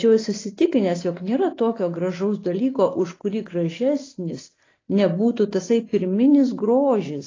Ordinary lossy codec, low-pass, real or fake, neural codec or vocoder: AAC, 32 kbps; 7.2 kHz; real; none